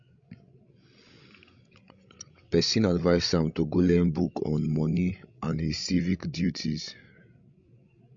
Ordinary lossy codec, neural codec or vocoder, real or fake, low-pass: MP3, 48 kbps; codec, 16 kHz, 16 kbps, FreqCodec, larger model; fake; 7.2 kHz